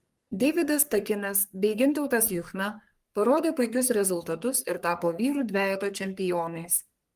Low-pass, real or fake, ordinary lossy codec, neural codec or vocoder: 14.4 kHz; fake; Opus, 32 kbps; codec, 44.1 kHz, 3.4 kbps, Pupu-Codec